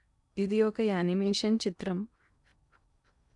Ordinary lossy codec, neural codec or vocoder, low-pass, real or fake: none; codec, 16 kHz in and 24 kHz out, 0.8 kbps, FocalCodec, streaming, 65536 codes; 10.8 kHz; fake